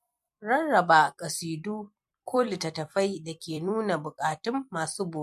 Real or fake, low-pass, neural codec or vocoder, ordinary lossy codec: fake; 14.4 kHz; vocoder, 44.1 kHz, 128 mel bands every 256 samples, BigVGAN v2; MP3, 64 kbps